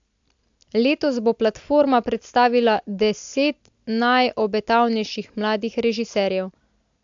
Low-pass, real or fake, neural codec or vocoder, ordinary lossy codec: 7.2 kHz; real; none; AAC, 64 kbps